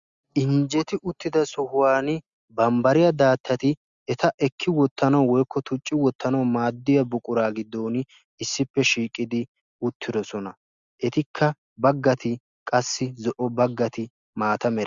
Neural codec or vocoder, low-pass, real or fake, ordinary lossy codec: none; 7.2 kHz; real; Opus, 64 kbps